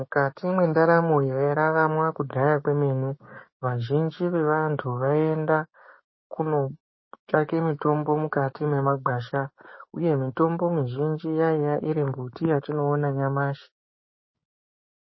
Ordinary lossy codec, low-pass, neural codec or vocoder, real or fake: MP3, 24 kbps; 7.2 kHz; codec, 16 kHz, 6 kbps, DAC; fake